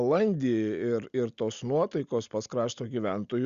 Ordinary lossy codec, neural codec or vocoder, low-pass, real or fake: Opus, 64 kbps; none; 7.2 kHz; real